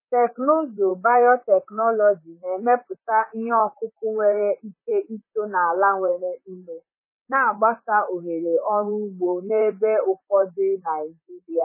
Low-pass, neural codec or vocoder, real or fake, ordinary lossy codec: 3.6 kHz; vocoder, 44.1 kHz, 128 mel bands, Pupu-Vocoder; fake; MP3, 16 kbps